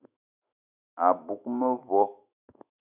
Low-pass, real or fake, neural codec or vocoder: 3.6 kHz; fake; autoencoder, 48 kHz, 128 numbers a frame, DAC-VAE, trained on Japanese speech